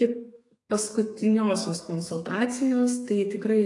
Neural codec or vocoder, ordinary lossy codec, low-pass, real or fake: codec, 32 kHz, 1.9 kbps, SNAC; AAC, 48 kbps; 10.8 kHz; fake